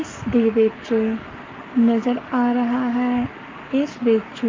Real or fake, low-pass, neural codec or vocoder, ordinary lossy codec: fake; 7.2 kHz; codec, 44.1 kHz, 7.8 kbps, Pupu-Codec; Opus, 32 kbps